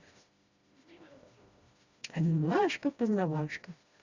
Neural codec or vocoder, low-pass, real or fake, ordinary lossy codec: codec, 16 kHz, 1 kbps, FreqCodec, smaller model; 7.2 kHz; fake; Opus, 64 kbps